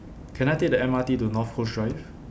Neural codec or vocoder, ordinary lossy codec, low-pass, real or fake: none; none; none; real